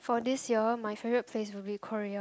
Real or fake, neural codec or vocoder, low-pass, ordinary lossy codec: real; none; none; none